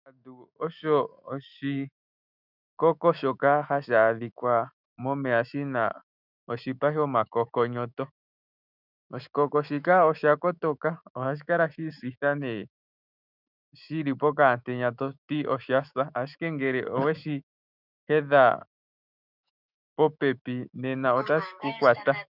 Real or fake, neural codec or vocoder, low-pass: fake; autoencoder, 48 kHz, 128 numbers a frame, DAC-VAE, trained on Japanese speech; 5.4 kHz